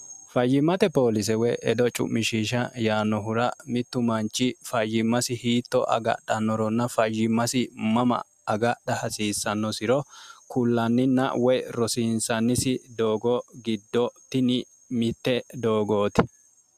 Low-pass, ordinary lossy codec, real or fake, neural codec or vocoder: 14.4 kHz; AAC, 96 kbps; real; none